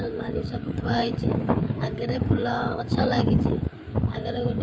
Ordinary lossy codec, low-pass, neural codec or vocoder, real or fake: none; none; codec, 16 kHz, 4 kbps, FreqCodec, larger model; fake